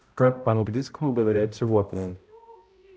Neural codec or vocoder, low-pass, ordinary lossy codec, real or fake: codec, 16 kHz, 0.5 kbps, X-Codec, HuBERT features, trained on balanced general audio; none; none; fake